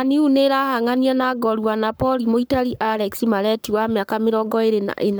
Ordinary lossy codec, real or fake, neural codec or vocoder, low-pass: none; fake; codec, 44.1 kHz, 7.8 kbps, Pupu-Codec; none